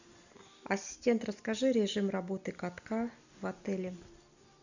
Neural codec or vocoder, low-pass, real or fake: none; 7.2 kHz; real